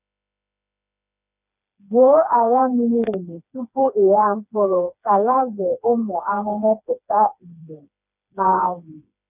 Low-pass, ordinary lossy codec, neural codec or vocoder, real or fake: 3.6 kHz; none; codec, 16 kHz, 2 kbps, FreqCodec, smaller model; fake